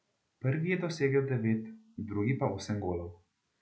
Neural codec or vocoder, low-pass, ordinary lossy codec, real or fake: none; none; none; real